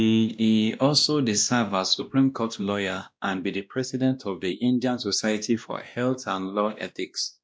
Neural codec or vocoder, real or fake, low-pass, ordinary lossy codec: codec, 16 kHz, 1 kbps, X-Codec, WavLM features, trained on Multilingual LibriSpeech; fake; none; none